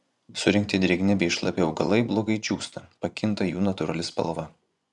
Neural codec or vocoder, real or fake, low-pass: vocoder, 24 kHz, 100 mel bands, Vocos; fake; 10.8 kHz